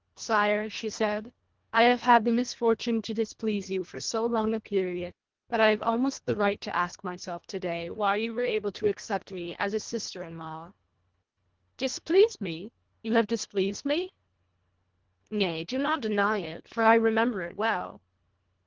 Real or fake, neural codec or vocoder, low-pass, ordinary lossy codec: fake; codec, 24 kHz, 1.5 kbps, HILCodec; 7.2 kHz; Opus, 16 kbps